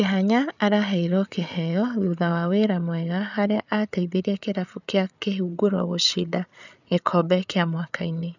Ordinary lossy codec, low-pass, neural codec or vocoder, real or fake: none; 7.2 kHz; codec, 16 kHz, 8 kbps, FreqCodec, larger model; fake